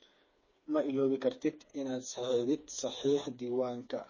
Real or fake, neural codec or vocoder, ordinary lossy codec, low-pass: fake; codec, 16 kHz, 4 kbps, FreqCodec, smaller model; MP3, 32 kbps; 7.2 kHz